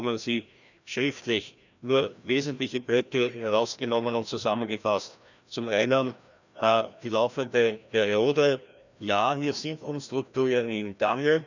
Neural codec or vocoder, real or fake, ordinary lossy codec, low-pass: codec, 16 kHz, 1 kbps, FreqCodec, larger model; fake; none; 7.2 kHz